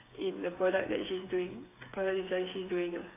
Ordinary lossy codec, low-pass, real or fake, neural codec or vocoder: AAC, 16 kbps; 3.6 kHz; fake; codec, 16 kHz, 8 kbps, FreqCodec, smaller model